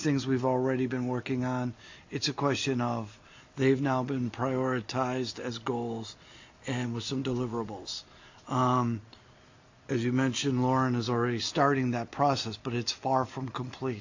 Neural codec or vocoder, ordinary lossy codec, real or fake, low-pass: none; AAC, 48 kbps; real; 7.2 kHz